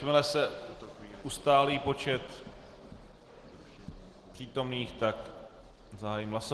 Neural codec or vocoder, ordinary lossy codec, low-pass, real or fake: none; Opus, 16 kbps; 10.8 kHz; real